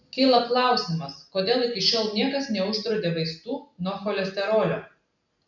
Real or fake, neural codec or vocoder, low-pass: real; none; 7.2 kHz